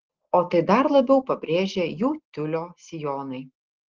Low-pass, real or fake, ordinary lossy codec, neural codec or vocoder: 7.2 kHz; real; Opus, 16 kbps; none